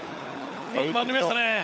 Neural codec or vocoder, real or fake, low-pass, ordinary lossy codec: codec, 16 kHz, 16 kbps, FunCodec, trained on LibriTTS, 50 frames a second; fake; none; none